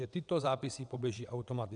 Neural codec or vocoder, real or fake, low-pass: vocoder, 22.05 kHz, 80 mel bands, WaveNeXt; fake; 9.9 kHz